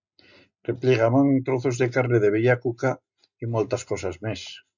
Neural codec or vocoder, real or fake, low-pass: none; real; 7.2 kHz